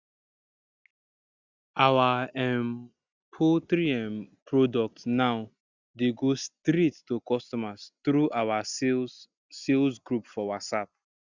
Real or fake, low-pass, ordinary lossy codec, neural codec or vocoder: real; 7.2 kHz; Opus, 64 kbps; none